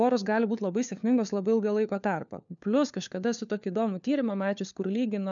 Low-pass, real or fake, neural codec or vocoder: 7.2 kHz; fake; codec, 16 kHz, 4 kbps, FunCodec, trained on LibriTTS, 50 frames a second